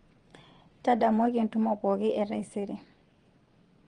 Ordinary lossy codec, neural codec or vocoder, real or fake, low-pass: Opus, 24 kbps; vocoder, 22.05 kHz, 80 mel bands, WaveNeXt; fake; 9.9 kHz